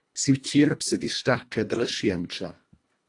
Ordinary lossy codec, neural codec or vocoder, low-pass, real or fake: AAC, 48 kbps; codec, 24 kHz, 1.5 kbps, HILCodec; 10.8 kHz; fake